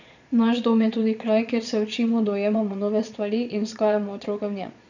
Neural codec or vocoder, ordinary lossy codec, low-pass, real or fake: vocoder, 22.05 kHz, 80 mel bands, Vocos; none; 7.2 kHz; fake